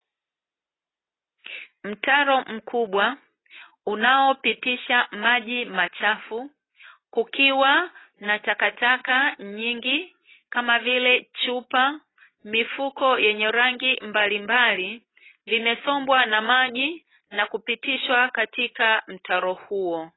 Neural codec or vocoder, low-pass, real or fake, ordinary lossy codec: none; 7.2 kHz; real; AAC, 16 kbps